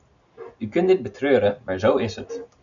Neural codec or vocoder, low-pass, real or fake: none; 7.2 kHz; real